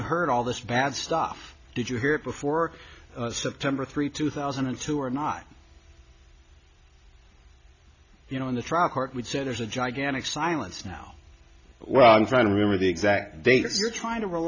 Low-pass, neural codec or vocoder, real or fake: 7.2 kHz; none; real